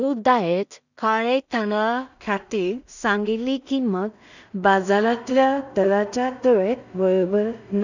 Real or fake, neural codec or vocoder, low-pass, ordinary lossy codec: fake; codec, 16 kHz in and 24 kHz out, 0.4 kbps, LongCat-Audio-Codec, two codebook decoder; 7.2 kHz; none